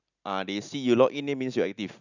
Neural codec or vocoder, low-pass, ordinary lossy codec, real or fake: none; 7.2 kHz; none; real